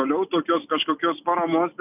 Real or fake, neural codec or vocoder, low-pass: real; none; 3.6 kHz